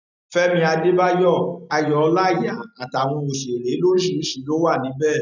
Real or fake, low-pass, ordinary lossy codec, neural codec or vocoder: real; 7.2 kHz; none; none